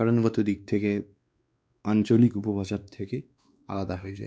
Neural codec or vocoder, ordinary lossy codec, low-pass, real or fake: codec, 16 kHz, 2 kbps, X-Codec, WavLM features, trained on Multilingual LibriSpeech; none; none; fake